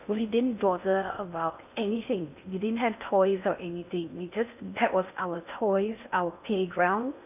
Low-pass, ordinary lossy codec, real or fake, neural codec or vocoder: 3.6 kHz; none; fake; codec, 16 kHz in and 24 kHz out, 0.6 kbps, FocalCodec, streaming, 4096 codes